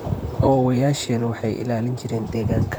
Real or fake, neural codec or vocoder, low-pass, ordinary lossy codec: fake; vocoder, 44.1 kHz, 128 mel bands, Pupu-Vocoder; none; none